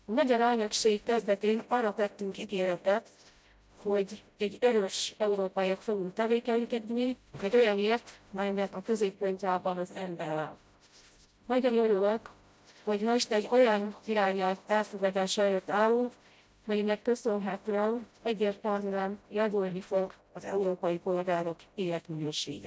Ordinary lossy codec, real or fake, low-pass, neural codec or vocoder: none; fake; none; codec, 16 kHz, 0.5 kbps, FreqCodec, smaller model